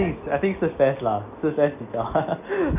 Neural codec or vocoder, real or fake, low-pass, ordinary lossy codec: none; real; 3.6 kHz; none